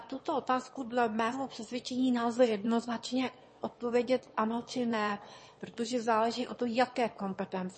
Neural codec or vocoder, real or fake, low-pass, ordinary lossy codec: autoencoder, 22.05 kHz, a latent of 192 numbers a frame, VITS, trained on one speaker; fake; 9.9 kHz; MP3, 32 kbps